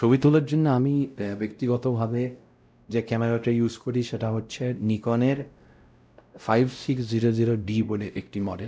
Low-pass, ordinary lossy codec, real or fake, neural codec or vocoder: none; none; fake; codec, 16 kHz, 0.5 kbps, X-Codec, WavLM features, trained on Multilingual LibriSpeech